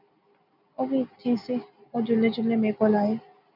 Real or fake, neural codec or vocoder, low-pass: real; none; 5.4 kHz